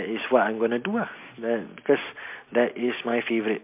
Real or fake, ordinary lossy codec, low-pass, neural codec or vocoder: real; MP3, 32 kbps; 3.6 kHz; none